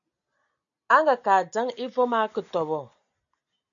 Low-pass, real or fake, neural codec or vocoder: 7.2 kHz; real; none